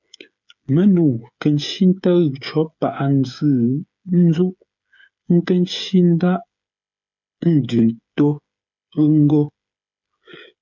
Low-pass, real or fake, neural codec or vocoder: 7.2 kHz; fake; codec, 16 kHz, 8 kbps, FreqCodec, smaller model